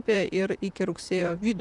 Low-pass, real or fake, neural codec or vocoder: 10.8 kHz; fake; vocoder, 44.1 kHz, 128 mel bands, Pupu-Vocoder